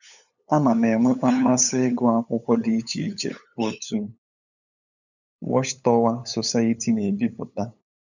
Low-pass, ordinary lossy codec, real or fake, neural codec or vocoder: 7.2 kHz; none; fake; codec, 16 kHz, 8 kbps, FunCodec, trained on LibriTTS, 25 frames a second